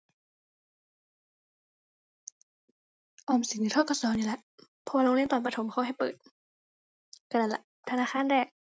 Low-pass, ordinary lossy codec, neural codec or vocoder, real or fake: none; none; none; real